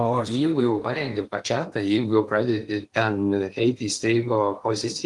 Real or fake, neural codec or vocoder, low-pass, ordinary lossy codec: fake; codec, 16 kHz in and 24 kHz out, 0.8 kbps, FocalCodec, streaming, 65536 codes; 10.8 kHz; Opus, 24 kbps